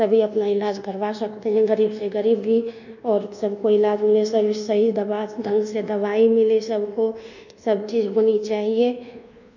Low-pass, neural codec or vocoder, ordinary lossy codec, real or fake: 7.2 kHz; codec, 24 kHz, 1.2 kbps, DualCodec; none; fake